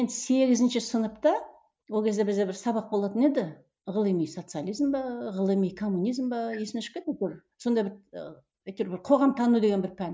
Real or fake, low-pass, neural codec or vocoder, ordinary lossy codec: real; none; none; none